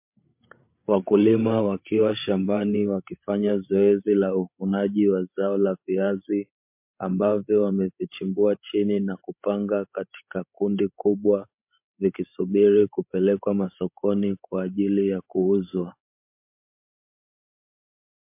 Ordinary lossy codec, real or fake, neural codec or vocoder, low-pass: MP3, 32 kbps; fake; codec, 16 kHz, 16 kbps, FreqCodec, larger model; 3.6 kHz